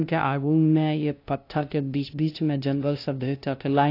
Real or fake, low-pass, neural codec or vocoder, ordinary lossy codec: fake; 5.4 kHz; codec, 16 kHz, 0.5 kbps, FunCodec, trained on LibriTTS, 25 frames a second; AAC, 32 kbps